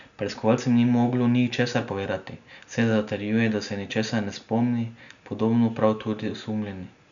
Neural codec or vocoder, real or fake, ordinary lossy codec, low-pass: none; real; none; 7.2 kHz